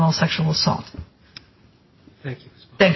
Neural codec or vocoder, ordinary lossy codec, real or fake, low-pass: none; MP3, 24 kbps; real; 7.2 kHz